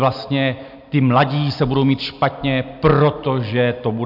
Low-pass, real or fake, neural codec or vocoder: 5.4 kHz; real; none